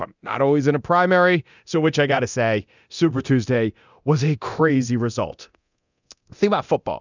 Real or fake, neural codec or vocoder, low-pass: fake; codec, 24 kHz, 0.9 kbps, DualCodec; 7.2 kHz